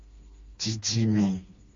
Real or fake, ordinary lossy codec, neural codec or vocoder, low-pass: fake; MP3, 48 kbps; codec, 16 kHz, 2 kbps, FreqCodec, smaller model; 7.2 kHz